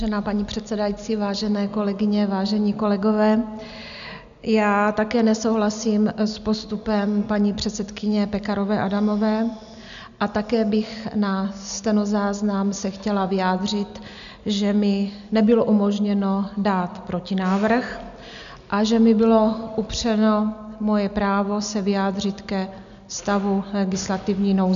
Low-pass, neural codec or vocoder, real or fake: 7.2 kHz; none; real